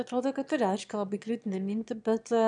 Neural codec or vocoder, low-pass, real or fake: autoencoder, 22.05 kHz, a latent of 192 numbers a frame, VITS, trained on one speaker; 9.9 kHz; fake